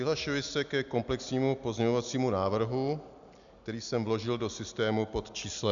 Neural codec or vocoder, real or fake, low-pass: none; real; 7.2 kHz